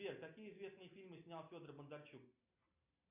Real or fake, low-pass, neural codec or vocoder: real; 3.6 kHz; none